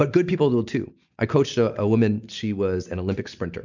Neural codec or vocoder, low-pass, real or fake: none; 7.2 kHz; real